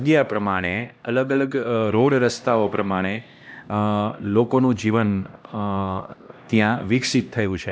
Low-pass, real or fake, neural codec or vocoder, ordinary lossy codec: none; fake; codec, 16 kHz, 1 kbps, X-Codec, HuBERT features, trained on LibriSpeech; none